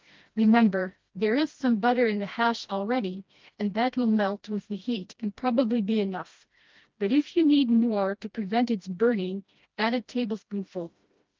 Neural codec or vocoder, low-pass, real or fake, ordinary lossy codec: codec, 16 kHz, 1 kbps, FreqCodec, smaller model; 7.2 kHz; fake; Opus, 32 kbps